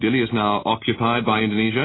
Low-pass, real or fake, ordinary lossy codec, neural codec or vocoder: 7.2 kHz; real; AAC, 16 kbps; none